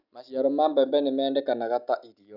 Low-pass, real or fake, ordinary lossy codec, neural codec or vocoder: 5.4 kHz; real; none; none